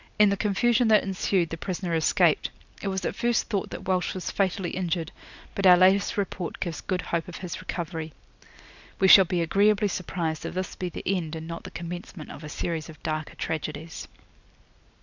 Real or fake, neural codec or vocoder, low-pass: real; none; 7.2 kHz